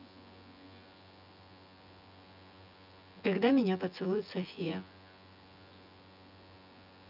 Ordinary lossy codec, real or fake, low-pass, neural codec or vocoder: none; fake; 5.4 kHz; vocoder, 24 kHz, 100 mel bands, Vocos